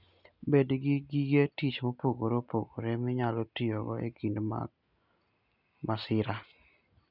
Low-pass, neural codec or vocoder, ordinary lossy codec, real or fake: 5.4 kHz; none; none; real